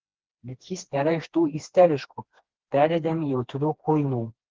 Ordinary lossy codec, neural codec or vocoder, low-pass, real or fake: Opus, 16 kbps; codec, 16 kHz, 2 kbps, FreqCodec, smaller model; 7.2 kHz; fake